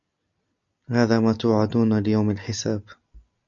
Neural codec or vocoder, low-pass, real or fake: none; 7.2 kHz; real